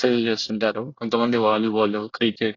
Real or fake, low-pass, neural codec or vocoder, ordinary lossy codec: fake; 7.2 kHz; codec, 24 kHz, 1 kbps, SNAC; AAC, 32 kbps